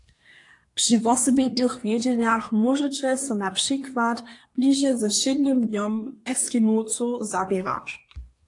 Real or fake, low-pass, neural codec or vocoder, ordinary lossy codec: fake; 10.8 kHz; codec, 24 kHz, 1 kbps, SNAC; AAC, 48 kbps